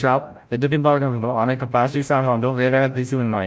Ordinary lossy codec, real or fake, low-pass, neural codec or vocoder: none; fake; none; codec, 16 kHz, 0.5 kbps, FreqCodec, larger model